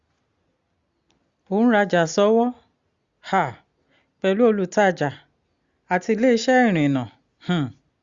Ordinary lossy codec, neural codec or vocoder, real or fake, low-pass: Opus, 64 kbps; none; real; 7.2 kHz